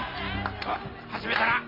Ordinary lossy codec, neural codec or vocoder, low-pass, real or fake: MP3, 32 kbps; none; 5.4 kHz; real